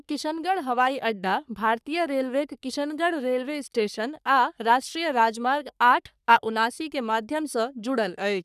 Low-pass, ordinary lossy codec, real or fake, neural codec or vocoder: 14.4 kHz; none; fake; codec, 44.1 kHz, 3.4 kbps, Pupu-Codec